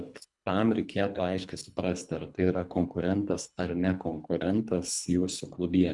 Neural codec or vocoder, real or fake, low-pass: codec, 24 kHz, 3 kbps, HILCodec; fake; 10.8 kHz